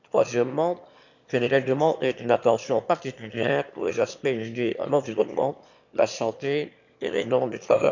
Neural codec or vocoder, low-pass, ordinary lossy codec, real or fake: autoencoder, 22.05 kHz, a latent of 192 numbers a frame, VITS, trained on one speaker; 7.2 kHz; none; fake